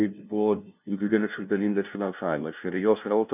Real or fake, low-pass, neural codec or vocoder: fake; 3.6 kHz; codec, 16 kHz, 0.5 kbps, FunCodec, trained on LibriTTS, 25 frames a second